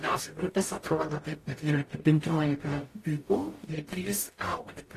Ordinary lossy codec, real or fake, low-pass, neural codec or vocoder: AAC, 48 kbps; fake; 14.4 kHz; codec, 44.1 kHz, 0.9 kbps, DAC